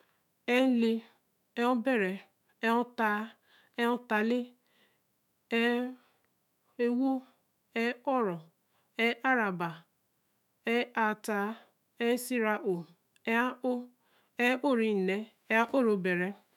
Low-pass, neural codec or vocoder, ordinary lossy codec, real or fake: 19.8 kHz; autoencoder, 48 kHz, 128 numbers a frame, DAC-VAE, trained on Japanese speech; none; fake